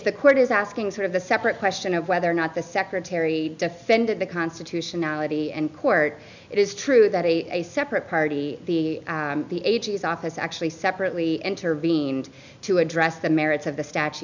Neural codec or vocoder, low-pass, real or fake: none; 7.2 kHz; real